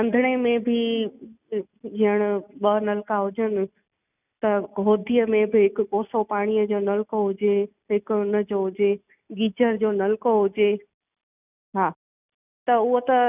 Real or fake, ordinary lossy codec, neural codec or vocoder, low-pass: real; none; none; 3.6 kHz